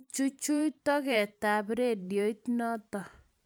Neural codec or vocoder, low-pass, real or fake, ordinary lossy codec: vocoder, 44.1 kHz, 128 mel bands every 512 samples, BigVGAN v2; none; fake; none